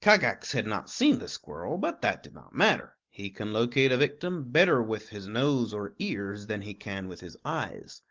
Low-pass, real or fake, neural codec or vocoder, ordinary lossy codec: 7.2 kHz; real; none; Opus, 16 kbps